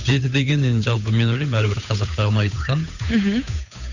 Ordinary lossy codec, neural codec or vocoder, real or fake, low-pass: none; codec, 16 kHz, 8 kbps, FunCodec, trained on Chinese and English, 25 frames a second; fake; 7.2 kHz